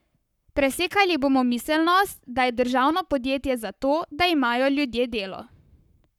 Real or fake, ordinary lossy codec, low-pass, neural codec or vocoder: fake; none; 19.8 kHz; codec, 44.1 kHz, 7.8 kbps, Pupu-Codec